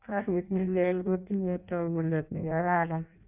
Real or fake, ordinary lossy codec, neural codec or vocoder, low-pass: fake; none; codec, 16 kHz in and 24 kHz out, 0.6 kbps, FireRedTTS-2 codec; 3.6 kHz